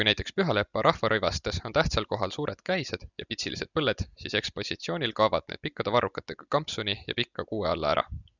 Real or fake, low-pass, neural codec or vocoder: real; 7.2 kHz; none